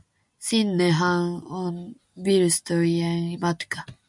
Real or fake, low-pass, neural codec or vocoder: real; 10.8 kHz; none